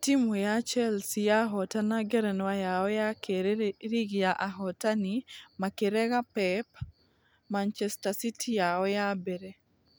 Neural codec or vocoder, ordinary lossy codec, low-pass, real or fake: none; none; none; real